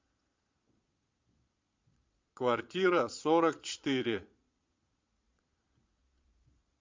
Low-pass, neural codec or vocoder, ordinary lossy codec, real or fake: 7.2 kHz; vocoder, 22.05 kHz, 80 mel bands, Vocos; MP3, 64 kbps; fake